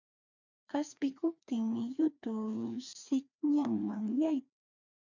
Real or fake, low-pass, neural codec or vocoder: fake; 7.2 kHz; codec, 16 kHz, 2 kbps, FreqCodec, larger model